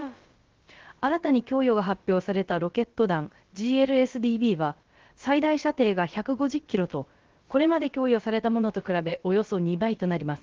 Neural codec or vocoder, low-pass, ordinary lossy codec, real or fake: codec, 16 kHz, about 1 kbps, DyCAST, with the encoder's durations; 7.2 kHz; Opus, 16 kbps; fake